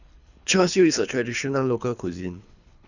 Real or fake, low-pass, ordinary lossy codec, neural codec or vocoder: fake; 7.2 kHz; none; codec, 24 kHz, 3 kbps, HILCodec